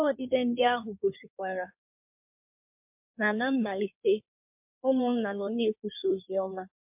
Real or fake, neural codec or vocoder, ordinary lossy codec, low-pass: fake; codec, 16 kHz in and 24 kHz out, 1.1 kbps, FireRedTTS-2 codec; MP3, 32 kbps; 3.6 kHz